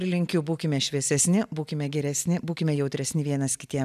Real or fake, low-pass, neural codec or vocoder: real; 14.4 kHz; none